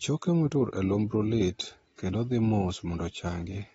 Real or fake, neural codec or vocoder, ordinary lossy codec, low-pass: real; none; AAC, 24 kbps; 19.8 kHz